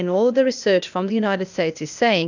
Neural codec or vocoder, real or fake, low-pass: codec, 16 kHz, 0.8 kbps, ZipCodec; fake; 7.2 kHz